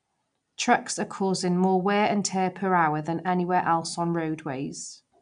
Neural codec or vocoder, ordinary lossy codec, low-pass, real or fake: none; none; 9.9 kHz; real